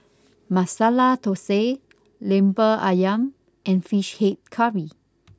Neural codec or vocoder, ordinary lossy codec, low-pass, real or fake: none; none; none; real